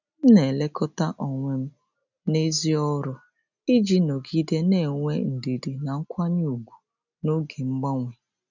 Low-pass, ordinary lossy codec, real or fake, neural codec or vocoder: 7.2 kHz; none; real; none